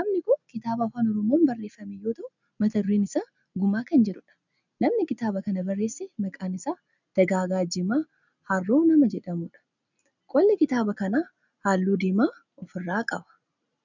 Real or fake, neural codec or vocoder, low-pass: real; none; 7.2 kHz